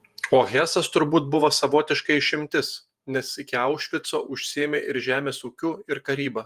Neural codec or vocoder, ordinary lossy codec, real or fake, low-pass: vocoder, 48 kHz, 128 mel bands, Vocos; Opus, 32 kbps; fake; 14.4 kHz